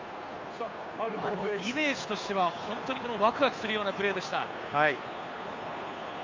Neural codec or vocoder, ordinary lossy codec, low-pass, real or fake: codec, 16 kHz, 2 kbps, FunCodec, trained on Chinese and English, 25 frames a second; MP3, 48 kbps; 7.2 kHz; fake